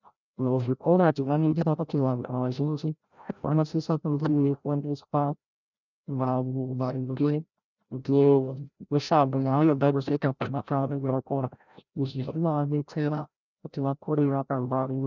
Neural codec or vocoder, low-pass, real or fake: codec, 16 kHz, 0.5 kbps, FreqCodec, larger model; 7.2 kHz; fake